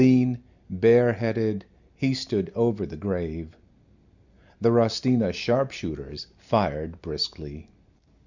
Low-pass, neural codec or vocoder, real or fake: 7.2 kHz; none; real